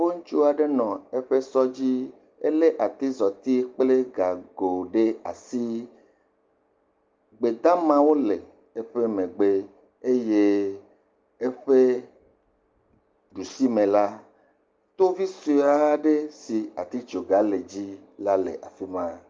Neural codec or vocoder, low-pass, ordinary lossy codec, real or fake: none; 7.2 kHz; Opus, 24 kbps; real